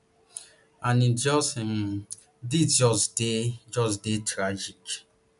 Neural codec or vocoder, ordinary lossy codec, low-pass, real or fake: none; none; 10.8 kHz; real